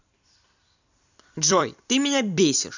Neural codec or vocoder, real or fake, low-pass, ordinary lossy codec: vocoder, 44.1 kHz, 128 mel bands every 256 samples, BigVGAN v2; fake; 7.2 kHz; none